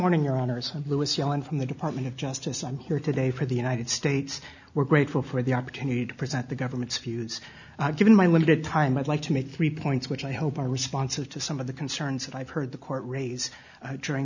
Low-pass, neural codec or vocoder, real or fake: 7.2 kHz; none; real